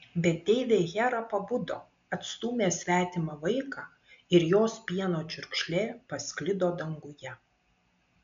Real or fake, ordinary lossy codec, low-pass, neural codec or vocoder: real; MP3, 64 kbps; 7.2 kHz; none